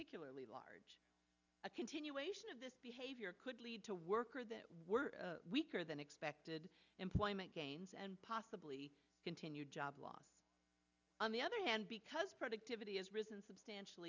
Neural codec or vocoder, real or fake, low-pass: none; real; 7.2 kHz